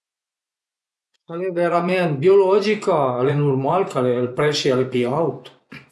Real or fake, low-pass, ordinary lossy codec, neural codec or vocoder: fake; none; none; vocoder, 24 kHz, 100 mel bands, Vocos